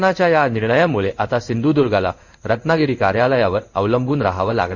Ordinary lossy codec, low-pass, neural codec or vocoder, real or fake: none; 7.2 kHz; codec, 16 kHz in and 24 kHz out, 1 kbps, XY-Tokenizer; fake